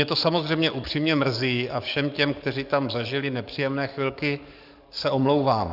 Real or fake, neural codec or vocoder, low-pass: fake; codec, 44.1 kHz, 7.8 kbps, DAC; 5.4 kHz